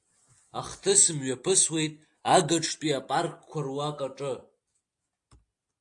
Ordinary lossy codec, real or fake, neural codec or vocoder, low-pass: AAC, 64 kbps; real; none; 10.8 kHz